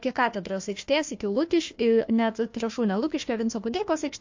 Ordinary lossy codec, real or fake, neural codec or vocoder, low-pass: MP3, 48 kbps; fake; codec, 16 kHz, 1 kbps, FunCodec, trained on Chinese and English, 50 frames a second; 7.2 kHz